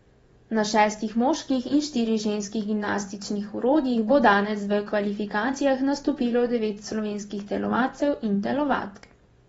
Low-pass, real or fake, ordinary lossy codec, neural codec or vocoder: 10.8 kHz; fake; AAC, 24 kbps; vocoder, 24 kHz, 100 mel bands, Vocos